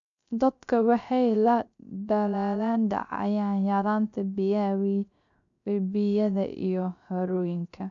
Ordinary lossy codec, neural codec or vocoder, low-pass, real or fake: none; codec, 16 kHz, 0.3 kbps, FocalCodec; 7.2 kHz; fake